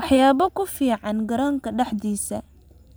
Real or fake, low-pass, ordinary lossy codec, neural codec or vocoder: fake; none; none; vocoder, 44.1 kHz, 128 mel bands every 512 samples, BigVGAN v2